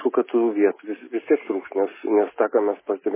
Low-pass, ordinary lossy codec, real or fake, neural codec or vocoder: 3.6 kHz; MP3, 16 kbps; real; none